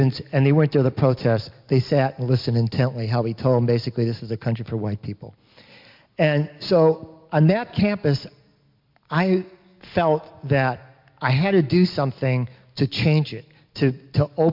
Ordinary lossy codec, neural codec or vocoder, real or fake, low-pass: MP3, 48 kbps; none; real; 5.4 kHz